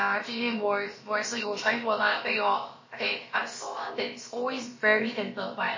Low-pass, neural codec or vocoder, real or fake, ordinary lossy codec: 7.2 kHz; codec, 16 kHz, about 1 kbps, DyCAST, with the encoder's durations; fake; MP3, 32 kbps